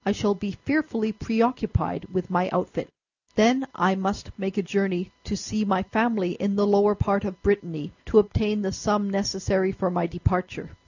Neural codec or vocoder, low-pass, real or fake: none; 7.2 kHz; real